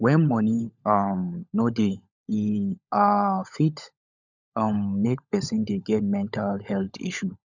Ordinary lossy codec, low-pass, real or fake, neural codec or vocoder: none; 7.2 kHz; fake; codec, 16 kHz, 16 kbps, FunCodec, trained on LibriTTS, 50 frames a second